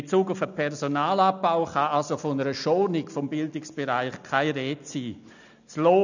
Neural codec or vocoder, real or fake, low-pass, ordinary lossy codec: none; real; 7.2 kHz; none